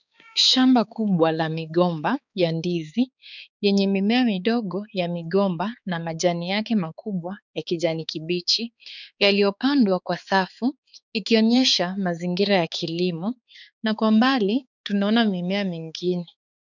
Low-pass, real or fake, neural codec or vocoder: 7.2 kHz; fake; codec, 16 kHz, 4 kbps, X-Codec, HuBERT features, trained on balanced general audio